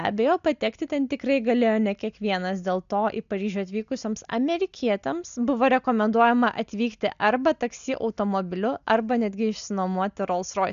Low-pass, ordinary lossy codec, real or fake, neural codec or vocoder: 7.2 kHz; AAC, 96 kbps; real; none